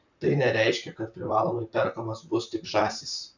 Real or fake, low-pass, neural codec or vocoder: fake; 7.2 kHz; vocoder, 44.1 kHz, 128 mel bands, Pupu-Vocoder